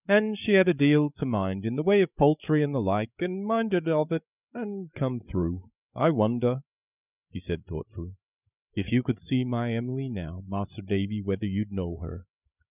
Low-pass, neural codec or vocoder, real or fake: 3.6 kHz; codec, 16 kHz, 8 kbps, FreqCodec, larger model; fake